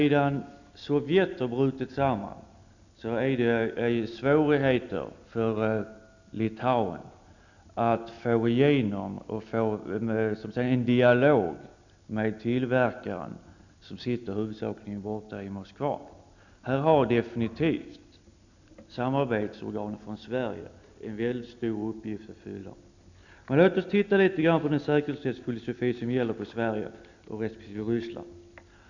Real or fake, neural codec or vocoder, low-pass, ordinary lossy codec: real; none; 7.2 kHz; none